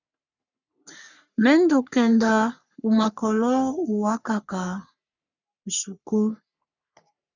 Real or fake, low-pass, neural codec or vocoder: fake; 7.2 kHz; codec, 44.1 kHz, 3.4 kbps, Pupu-Codec